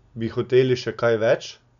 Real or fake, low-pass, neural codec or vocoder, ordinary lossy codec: real; 7.2 kHz; none; none